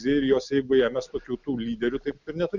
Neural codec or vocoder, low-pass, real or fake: none; 7.2 kHz; real